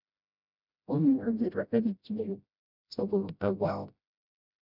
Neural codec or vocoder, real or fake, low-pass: codec, 16 kHz, 0.5 kbps, FreqCodec, smaller model; fake; 5.4 kHz